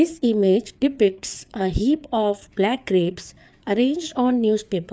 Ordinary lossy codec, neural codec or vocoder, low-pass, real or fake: none; codec, 16 kHz, 4 kbps, FreqCodec, larger model; none; fake